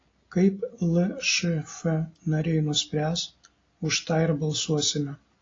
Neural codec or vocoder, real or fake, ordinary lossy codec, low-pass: none; real; AAC, 32 kbps; 7.2 kHz